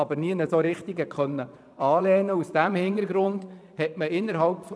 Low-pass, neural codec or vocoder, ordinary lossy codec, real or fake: none; vocoder, 22.05 kHz, 80 mel bands, Vocos; none; fake